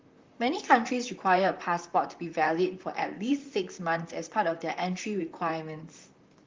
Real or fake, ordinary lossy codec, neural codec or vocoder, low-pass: fake; Opus, 32 kbps; vocoder, 44.1 kHz, 128 mel bands, Pupu-Vocoder; 7.2 kHz